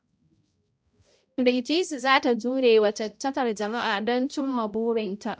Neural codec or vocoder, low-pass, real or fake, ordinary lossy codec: codec, 16 kHz, 0.5 kbps, X-Codec, HuBERT features, trained on balanced general audio; none; fake; none